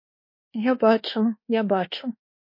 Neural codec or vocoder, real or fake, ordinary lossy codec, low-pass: codec, 24 kHz, 1.2 kbps, DualCodec; fake; MP3, 32 kbps; 5.4 kHz